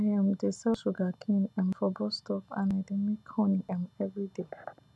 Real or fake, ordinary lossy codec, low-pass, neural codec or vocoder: real; none; none; none